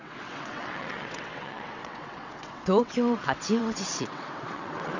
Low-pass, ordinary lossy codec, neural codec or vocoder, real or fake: 7.2 kHz; none; vocoder, 22.05 kHz, 80 mel bands, WaveNeXt; fake